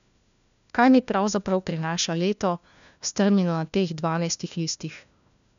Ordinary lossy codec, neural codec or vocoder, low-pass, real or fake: none; codec, 16 kHz, 1 kbps, FunCodec, trained on LibriTTS, 50 frames a second; 7.2 kHz; fake